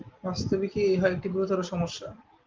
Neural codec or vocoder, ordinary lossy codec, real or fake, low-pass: none; Opus, 32 kbps; real; 7.2 kHz